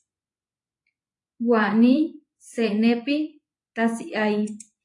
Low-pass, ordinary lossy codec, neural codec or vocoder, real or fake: 10.8 kHz; AAC, 64 kbps; vocoder, 24 kHz, 100 mel bands, Vocos; fake